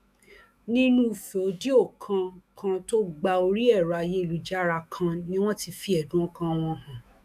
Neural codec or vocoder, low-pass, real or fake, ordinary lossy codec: autoencoder, 48 kHz, 128 numbers a frame, DAC-VAE, trained on Japanese speech; 14.4 kHz; fake; none